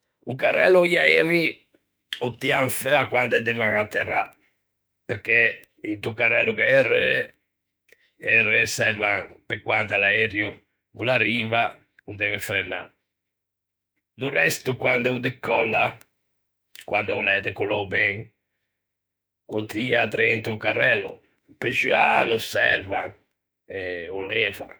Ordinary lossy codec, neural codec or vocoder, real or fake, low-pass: none; autoencoder, 48 kHz, 32 numbers a frame, DAC-VAE, trained on Japanese speech; fake; none